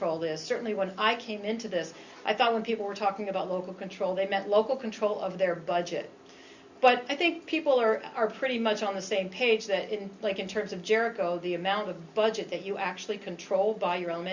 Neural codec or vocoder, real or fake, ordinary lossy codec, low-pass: none; real; Opus, 64 kbps; 7.2 kHz